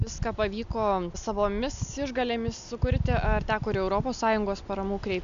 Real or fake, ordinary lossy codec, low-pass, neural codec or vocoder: real; AAC, 96 kbps; 7.2 kHz; none